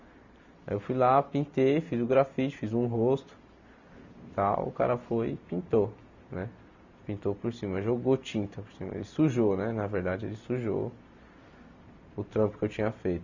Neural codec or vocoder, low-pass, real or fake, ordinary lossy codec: none; 7.2 kHz; real; none